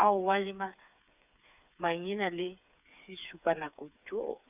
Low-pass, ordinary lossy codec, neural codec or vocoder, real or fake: 3.6 kHz; none; codec, 16 kHz, 4 kbps, FreqCodec, smaller model; fake